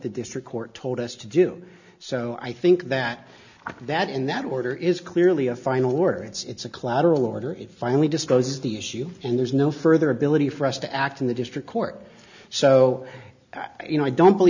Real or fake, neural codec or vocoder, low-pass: real; none; 7.2 kHz